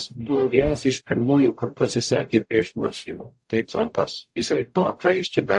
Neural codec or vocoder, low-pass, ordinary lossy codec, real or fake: codec, 44.1 kHz, 0.9 kbps, DAC; 10.8 kHz; AAC, 64 kbps; fake